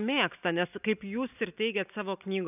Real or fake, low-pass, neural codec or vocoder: real; 3.6 kHz; none